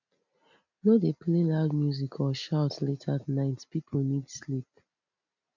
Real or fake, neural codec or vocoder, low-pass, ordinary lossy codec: real; none; 7.2 kHz; none